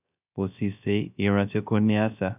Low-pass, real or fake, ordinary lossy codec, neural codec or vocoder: 3.6 kHz; fake; none; codec, 16 kHz, 0.3 kbps, FocalCodec